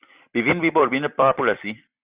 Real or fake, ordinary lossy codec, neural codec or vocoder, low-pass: fake; Opus, 64 kbps; vocoder, 44.1 kHz, 128 mel bands every 256 samples, BigVGAN v2; 3.6 kHz